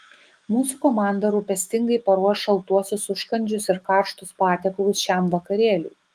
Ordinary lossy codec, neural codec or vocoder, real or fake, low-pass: Opus, 32 kbps; autoencoder, 48 kHz, 128 numbers a frame, DAC-VAE, trained on Japanese speech; fake; 14.4 kHz